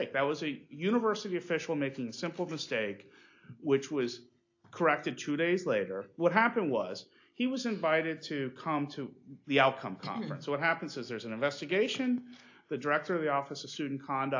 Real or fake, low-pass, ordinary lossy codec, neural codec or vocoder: real; 7.2 kHz; AAC, 48 kbps; none